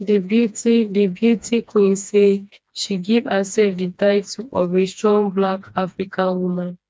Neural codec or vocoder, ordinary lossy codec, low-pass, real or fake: codec, 16 kHz, 2 kbps, FreqCodec, smaller model; none; none; fake